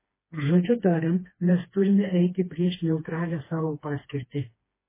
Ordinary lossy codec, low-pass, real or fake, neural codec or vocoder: MP3, 16 kbps; 3.6 kHz; fake; codec, 16 kHz, 2 kbps, FreqCodec, smaller model